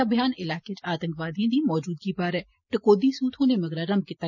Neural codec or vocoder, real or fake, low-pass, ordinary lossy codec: none; real; 7.2 kHz; none